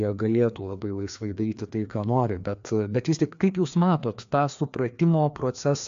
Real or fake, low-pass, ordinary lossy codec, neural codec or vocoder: fake; 7.2 kHz; AAC, 64 kbps; codec, 16 kHz, 2 kbps, FreqCodec, larger model